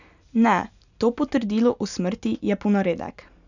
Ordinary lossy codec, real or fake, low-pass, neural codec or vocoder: none; real; 7.2 kHz; none